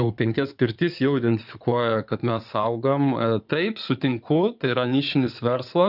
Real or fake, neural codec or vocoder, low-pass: fake; codec, 16 kHz in and 24 kHz out, 2.2 kbps, FireRedTTS-2 codec; 5.4 kHz